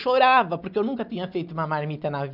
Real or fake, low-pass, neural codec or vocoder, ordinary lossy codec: real; 5.4 kHz; none; none